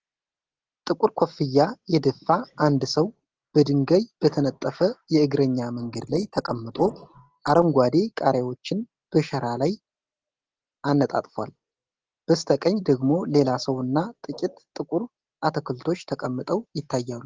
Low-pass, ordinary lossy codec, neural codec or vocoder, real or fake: 7.2 kHz; Opus, 16 kbps; none; real